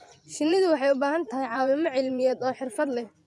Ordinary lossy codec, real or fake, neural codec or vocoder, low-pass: none; fake; vocoder, 24 kHz, 100 mel bands, Vocos; none